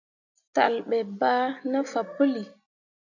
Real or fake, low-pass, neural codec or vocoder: real; 7.2 kHz; none